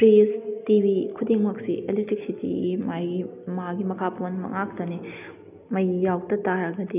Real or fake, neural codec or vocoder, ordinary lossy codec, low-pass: real; none; none; 3.6 kHz